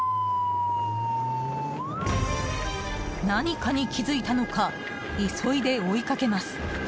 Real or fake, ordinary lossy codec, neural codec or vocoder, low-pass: real; none; none; none